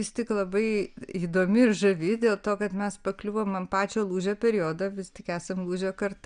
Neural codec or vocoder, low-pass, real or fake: none; 9.9 kHz; real